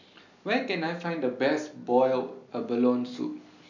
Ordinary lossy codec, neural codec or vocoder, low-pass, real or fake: none; none; 7.2 kHz; real